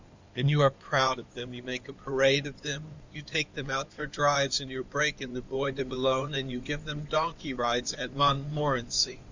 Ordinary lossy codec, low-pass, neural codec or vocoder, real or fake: Opus, 64 kbps; 7.2 kHz; codec, 16 kHz in and 24 kHz out, 2.2 kbps, FireRedTTS-2 codec; fake